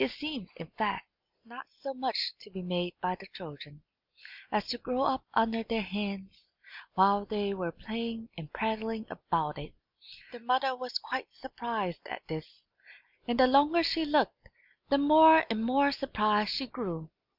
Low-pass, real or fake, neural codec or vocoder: 5.4 kHz; real; none